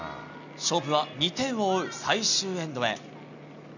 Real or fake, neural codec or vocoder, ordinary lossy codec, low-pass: real; none; none; 7.2 kHz